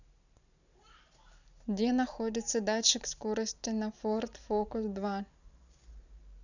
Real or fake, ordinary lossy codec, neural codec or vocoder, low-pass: fake; none; vocoder, 44.1 kHz, 128 mel bands every 512 samples, BigVGAN v2; 7.2 kHz